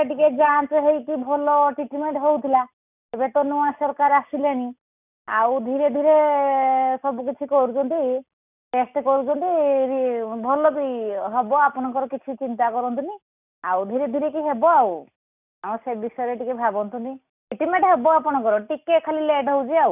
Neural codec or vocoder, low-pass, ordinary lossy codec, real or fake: none; 3.6 kHz; none; real